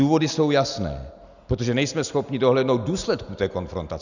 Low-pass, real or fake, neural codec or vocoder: 7.2 kHz; real; none